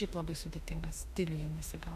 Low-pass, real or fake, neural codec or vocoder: 14.4 kHz; fake; autoencoder, 48 kHz, 32 numbers a frame, DAC-VAE, trained on Japanese speech